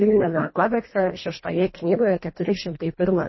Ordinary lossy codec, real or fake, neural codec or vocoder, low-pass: MP3, 24 kbps; fake; codec, 24 kHz, 1.5 kbps, HILCodec; 7.2 kHz